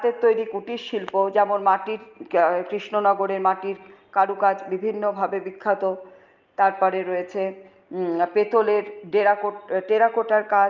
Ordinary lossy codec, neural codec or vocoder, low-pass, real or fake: Opus, 24 kbps; none; 7.2 kHz; real